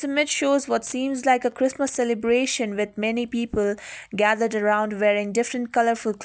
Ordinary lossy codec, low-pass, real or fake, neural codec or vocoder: none; none; real; none